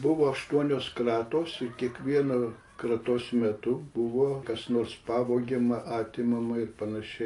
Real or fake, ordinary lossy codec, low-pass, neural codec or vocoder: real; AAC, 48 kbps; 10.8 kHz; none